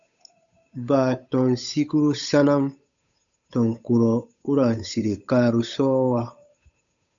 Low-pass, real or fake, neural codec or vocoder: 7.2 kHz; fake; codec, 16 kHz, 8 kbps, FunCodec, trained on Chinese and English, 25 frames a second